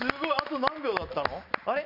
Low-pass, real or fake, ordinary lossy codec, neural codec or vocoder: 5.4 kHz; real; none; none